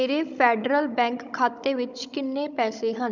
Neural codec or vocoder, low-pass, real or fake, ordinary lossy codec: codec, 16 kHz, 16 kbps, FunCodec, trained on Chinese and English, 50 frames a second; 7.2 kHz; fake; none